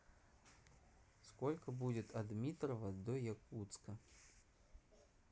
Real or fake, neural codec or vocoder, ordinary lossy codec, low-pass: real; none; none; none